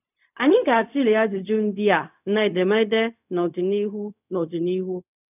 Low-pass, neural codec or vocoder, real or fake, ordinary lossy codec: 3.6 kHz; codec, 16 kHz, 0.4 kbps, LongCat-Audio-Codec; fake; none